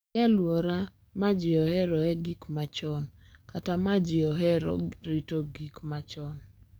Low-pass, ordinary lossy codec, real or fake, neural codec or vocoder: none; none; fake; codec, 44.1 kHz, 7.8 kbps, DAC